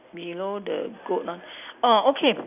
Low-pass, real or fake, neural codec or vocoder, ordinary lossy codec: 3.6 kHz; real; none; none